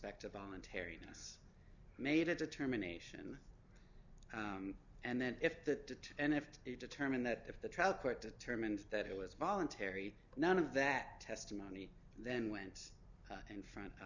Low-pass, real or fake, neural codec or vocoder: 7.2 kHz; real; none